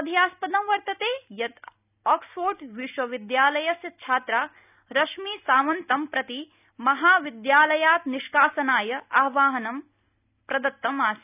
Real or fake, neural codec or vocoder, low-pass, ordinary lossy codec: real; none; 3.6 kHz; none